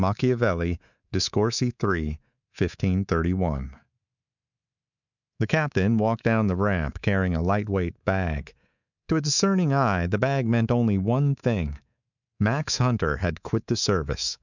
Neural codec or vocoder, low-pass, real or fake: codec, 24 kHz, 3.1 kbps, DualCodec; 7.2 kHz; fake